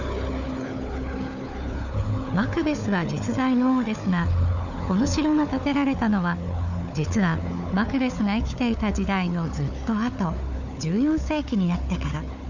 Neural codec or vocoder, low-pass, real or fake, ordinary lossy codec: codec, 16 kHz, 4 kbps, FunCodec, trained on Chinese and English, 50 frames a second; 7.2 kHz; fake; none